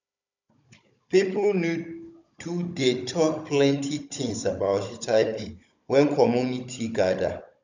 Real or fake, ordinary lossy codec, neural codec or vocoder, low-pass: fake; none; codec, 16 kHz, 16 kbps, FunCodec, trained on Chinese and English, 50 frames a second; 7.2 kHz